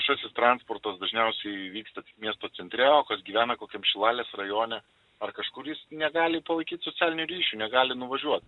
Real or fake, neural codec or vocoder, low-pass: real; none; 10.8 kHz